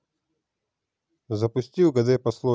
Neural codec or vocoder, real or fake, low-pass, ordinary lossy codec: none; real; none; none